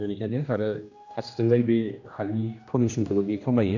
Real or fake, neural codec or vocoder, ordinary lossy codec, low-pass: fake; codec, 16 kHz, 1 kbps, X-Codec, HuBERT features, trained on general audio; AAC, 48 kbps; 7.2 kHz